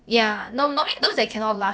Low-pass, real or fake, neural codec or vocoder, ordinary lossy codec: none; fake; codec, 16 kHz, about 1 kbps, DyCAST, with the encoder's durations; none